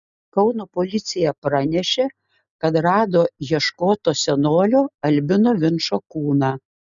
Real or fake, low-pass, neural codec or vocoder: real; 7.2 kHz; none